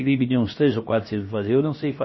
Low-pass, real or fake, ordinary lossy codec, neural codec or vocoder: 7.2 kHz; fake; MP3, 24 kbps; codec, 16 kHz, about 1 kbps, DyCAST, with the encoder's durations